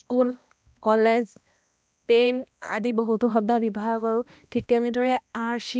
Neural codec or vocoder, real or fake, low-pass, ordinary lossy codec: codec, 16 kHz, 1 kbps, X-Codec, HuBERT features, trained on balanced general audio; fake; none; none